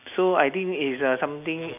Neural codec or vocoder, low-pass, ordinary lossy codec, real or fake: none; 3.6 kHz; none; real